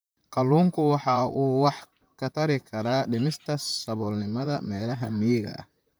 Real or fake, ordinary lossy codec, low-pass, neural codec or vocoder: fake; none; none; vocoder, 44.1 kHz, 128 mel bands, Pupu-Vocoder